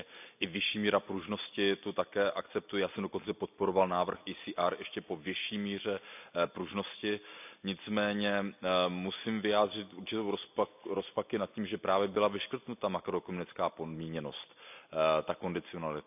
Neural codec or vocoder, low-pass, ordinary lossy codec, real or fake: none; 3.6 kHz; none; real